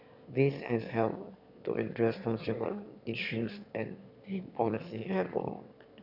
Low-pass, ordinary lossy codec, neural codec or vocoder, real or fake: 5.4 kHz; none; autoencoder, 22.05 kHz, a latent of 192 numbers a frame, VITS, trained on one speaker; fake